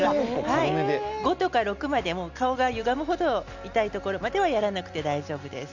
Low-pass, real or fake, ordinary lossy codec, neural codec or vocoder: 7.2 kHz; real; none; none